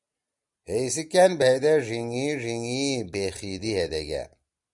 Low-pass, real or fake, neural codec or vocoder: 10.8 kHz; real; none